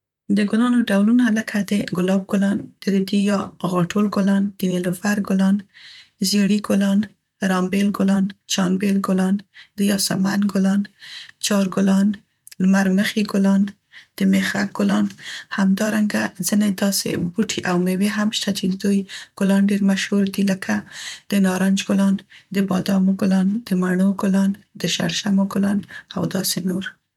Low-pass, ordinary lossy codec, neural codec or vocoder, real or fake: 19.8 kHz; none; vocoder, 44.1 kHz, 128 mel bands, Pupu-Vocoder; fake